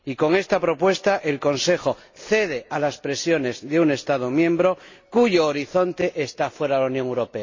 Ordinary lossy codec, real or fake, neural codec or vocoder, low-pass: MP3, 32 kbps; real; none; 7.2 kHz